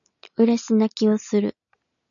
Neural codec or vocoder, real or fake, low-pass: none; real; 7.2 kHz